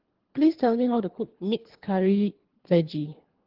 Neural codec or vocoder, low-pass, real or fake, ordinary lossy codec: codec, 24 kHz, 3 kbps, HILCodec; 5.4 kHz; fake; Opus, 16 kbps